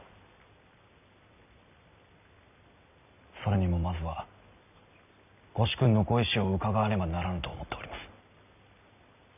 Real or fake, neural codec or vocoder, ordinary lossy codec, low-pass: real; none; none; 3.6 kHz